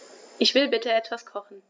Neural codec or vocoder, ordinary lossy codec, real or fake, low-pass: none; none; real; none